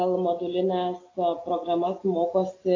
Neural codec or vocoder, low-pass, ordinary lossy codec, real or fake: none; 7.2 kHz; AAC, 32 kbps; real